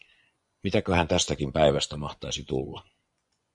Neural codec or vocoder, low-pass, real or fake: vocoder, 24 kHz, 100 mel bands, Vocos; 10.8 kHz; fake